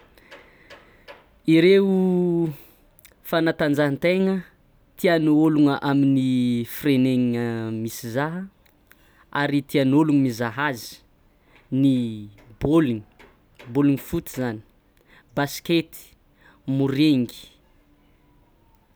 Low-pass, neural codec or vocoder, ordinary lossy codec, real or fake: none; none; none; real